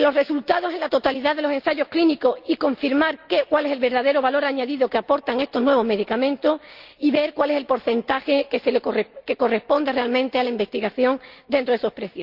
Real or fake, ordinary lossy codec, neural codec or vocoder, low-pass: real; Opus, 24 kbps; none; 5.4 kHz